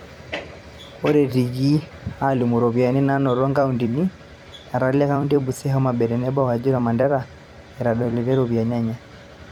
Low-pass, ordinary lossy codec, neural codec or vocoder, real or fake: 19.8 kHz; none; vocoder, 44.1 kHz, 128 mel bands every 256 samples, BigVGAN v2; fake